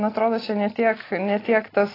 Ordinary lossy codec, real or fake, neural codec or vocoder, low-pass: AAC, 24 kbps; real; none; 5.4 kHz